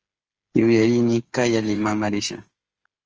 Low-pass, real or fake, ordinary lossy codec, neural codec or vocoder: 7.2 kHz; fake; Opus, 24 kbps; codec, 16 kHz, 8 kbps, FreqCodec, smaller model